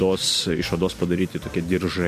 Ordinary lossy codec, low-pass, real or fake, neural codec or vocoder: MP3, 64 kbps; 14.4 kHz; real; none